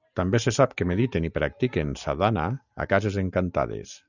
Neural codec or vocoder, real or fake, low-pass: none; real; 7.2 kHz